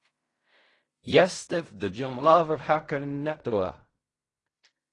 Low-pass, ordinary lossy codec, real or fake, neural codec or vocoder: 10.8 kHz; AAC, 32 kbps; fake; codec, 16 kHz in and 24 kHz out, 0.4 kbps, LongCat-Audio-Codec, fine tuned four codebook decoder